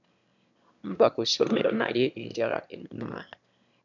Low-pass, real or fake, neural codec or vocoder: 7.2 kHz; fake; autoencoder, 22.05 kHz, a latent of 192 numbers a frame, VITS, trained on one speaker